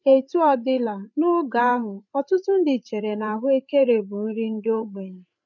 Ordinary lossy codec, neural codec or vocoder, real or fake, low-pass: none; codec, 16 kHz, 8 kbps, FreqCodec, larger model; fake; 7.2 kHz